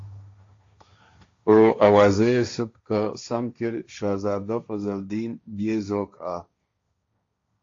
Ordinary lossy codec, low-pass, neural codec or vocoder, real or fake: AAC, 48 kbps; 7.2 kHz; codec, 16 kHz, 1.1 kbps, Voila-Tokenizer; fake